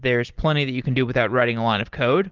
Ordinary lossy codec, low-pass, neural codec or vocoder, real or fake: Opus, 16 kbps; 7.2 kHz; none; real